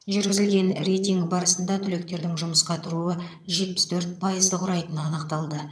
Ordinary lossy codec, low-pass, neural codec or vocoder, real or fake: none; none; vocoder, 22.05 kHz, 80 mel bands, HiFi-GAN; fake